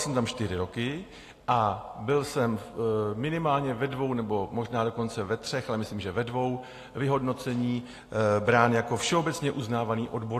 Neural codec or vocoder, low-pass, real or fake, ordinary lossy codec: none; 14.4 kHz; real; AAC, 48 kbps